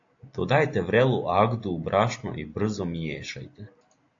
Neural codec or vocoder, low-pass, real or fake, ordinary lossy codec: none; 7.2 kHz; real; AAC, 48 kbps